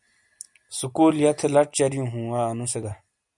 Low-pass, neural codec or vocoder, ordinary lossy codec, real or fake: 10.8 kHz; none; MP3, 96 kbps; real